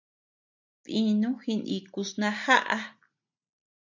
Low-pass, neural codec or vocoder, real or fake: 7.2 kHz; none; real